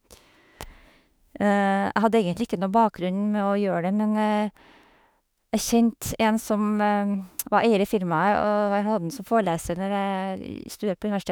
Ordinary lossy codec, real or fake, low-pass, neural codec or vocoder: none; fake; none; autoencoder, 48 kHz, 32 numbers a frame, DAC-VAE, trained on Japanese speech